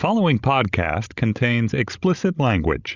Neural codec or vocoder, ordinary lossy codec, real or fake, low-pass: codec, 16 kHz, 16 kbps, FreqCodec, larger model; Opus, 64 kbps; fake; 7.2 kHz